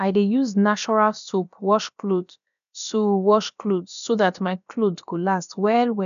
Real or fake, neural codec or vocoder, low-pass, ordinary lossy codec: fake; codec, 16 kHz, about 1 kbps, DyCAST, with the encoder's durations; 7.2 kHz; none